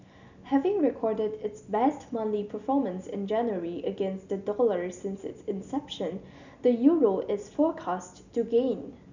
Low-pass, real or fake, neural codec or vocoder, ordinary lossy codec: 7.2 kHz; real; none; none